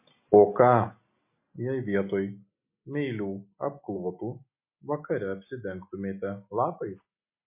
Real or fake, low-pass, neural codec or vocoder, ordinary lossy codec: real; 3.6 kHz; none; MP3, 24 kbps